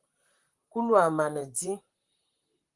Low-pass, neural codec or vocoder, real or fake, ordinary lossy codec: 10.8 kHz; vocoder, 44.1 kHz, 128 mel bands, Pupu-Vocoder; fake; Opus, 24 kbps